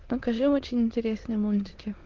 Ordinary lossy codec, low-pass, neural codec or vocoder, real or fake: Opus, 16 kbps; 7.2 kHz; autoencoder, 22.05 kHz, a latent of 192 numbers a frame, VITS, trained on many speakers; fake